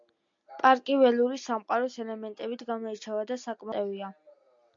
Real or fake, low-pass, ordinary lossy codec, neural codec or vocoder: real; 7.2 kHz; MP3, 64 kbps; none